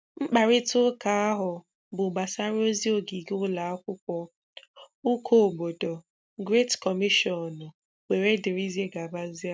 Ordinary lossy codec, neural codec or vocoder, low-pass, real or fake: none; none; none; real